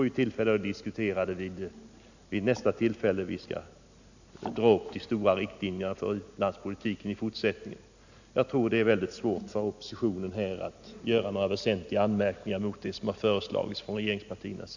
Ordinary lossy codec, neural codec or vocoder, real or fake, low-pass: none; none; real; 7.2 kHz